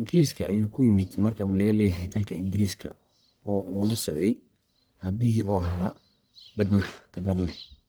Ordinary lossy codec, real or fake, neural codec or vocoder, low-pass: none; fake; codec, 44.1 kHz, 1.7 kbps, Pupu-Codec; none